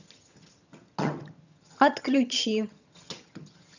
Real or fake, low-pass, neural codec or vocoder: fake; 7.2 kHz; vocoder, 22.05 kHz, 80 mel bands, HiFi-GAN